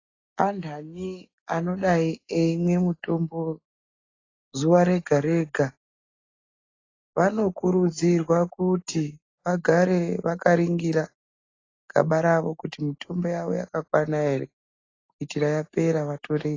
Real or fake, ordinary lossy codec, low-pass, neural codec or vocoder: real; AAC, 32 kbps; 7.2 kHz; none